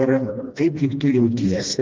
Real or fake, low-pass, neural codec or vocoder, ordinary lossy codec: fake; 7.2 kHz; codec, 16 kHz, 1 kbps, FreqCodec, smaller model; Opus, 24 kbps